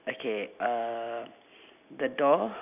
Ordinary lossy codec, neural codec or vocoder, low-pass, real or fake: none; none; 3.6 kHz; real